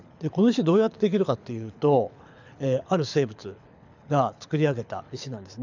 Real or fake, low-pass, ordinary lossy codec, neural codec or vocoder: fake; 7.2 kHz; none; codec, 24 kHz, 6 kbps, HILCodec